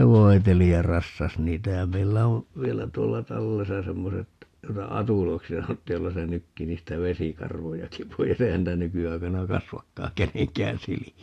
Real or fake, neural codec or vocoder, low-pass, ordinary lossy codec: real; none; 14.4 kHz; AAC, 64 kbps